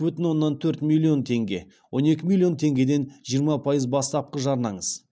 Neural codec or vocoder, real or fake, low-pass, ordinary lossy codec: none; real; none; none